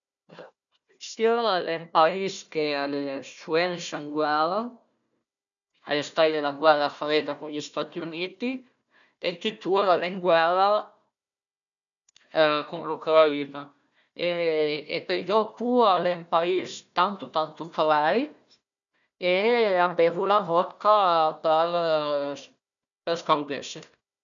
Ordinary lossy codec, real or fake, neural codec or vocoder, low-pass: none; fake; codec, 16 kHz, 1 kbps, FunCodec, trained on Chinese and English, 50 frames a second; 7.2 kHz